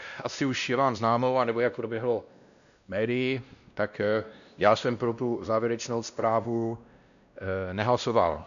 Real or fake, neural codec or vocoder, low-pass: fake; codec, 16 kHz, 1 kbps, X-Codec, WavLM features, trained on Multilingual LibriSpeech; 7.2 kHz